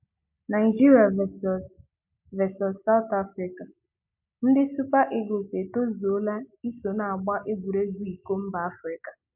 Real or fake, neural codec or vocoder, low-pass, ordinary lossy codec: real; none; 3.6 kHz; MP3, 32 kbps